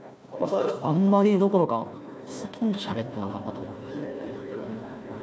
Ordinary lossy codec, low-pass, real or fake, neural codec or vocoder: none; none; fake; codec, 16 kHz, 1 kbps, FunCodec, trained on Chinese and English, 50 frames a second